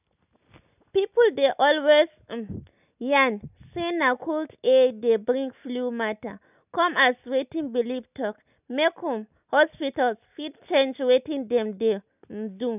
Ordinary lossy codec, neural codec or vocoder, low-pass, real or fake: none; none; 3.6 kHz; real